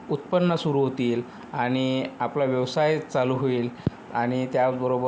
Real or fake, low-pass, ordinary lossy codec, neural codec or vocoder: real; none; none; none